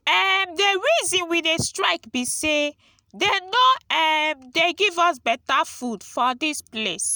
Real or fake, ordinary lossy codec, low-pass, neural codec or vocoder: real; none; none; none